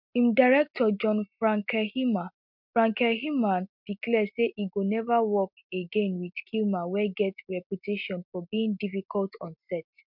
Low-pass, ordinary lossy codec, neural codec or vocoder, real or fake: 5.4 kHz; MP3, 48 kbps; none; real